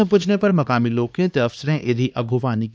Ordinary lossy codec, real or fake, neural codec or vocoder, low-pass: none; fake; codec, 16 kHz, 2 kbps, X-Codec, HuBERT features, trained on LibriSpeech; none